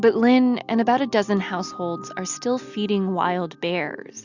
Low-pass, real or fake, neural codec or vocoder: 7.2 kHz; real; none